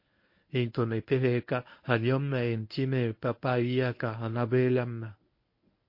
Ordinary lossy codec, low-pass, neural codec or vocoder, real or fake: MP3, 32 kbps; 5.4 kHz; codec, 24 kHz, 0.9 kbps, WavTokenizer, medium speech release version 1; fake